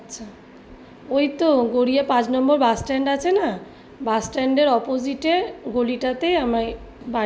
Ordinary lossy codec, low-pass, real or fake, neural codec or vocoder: none; none; real; none